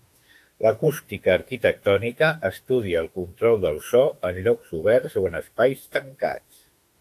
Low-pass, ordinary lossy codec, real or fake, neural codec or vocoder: 14.4 kHz; AAC, 64 kbps; fake; autoencoder, 48 kHz, 32 numbers a frame, DAC-VAE, trained on Japanese speech